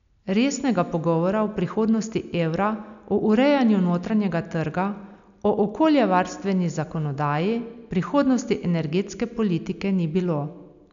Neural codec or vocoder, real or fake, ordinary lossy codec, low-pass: none; real; none; 7.2 kHz